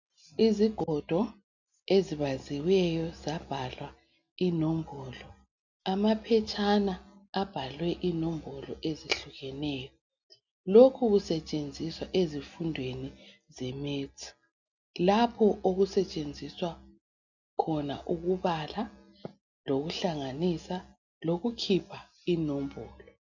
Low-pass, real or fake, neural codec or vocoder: 7.2 kHz; real; none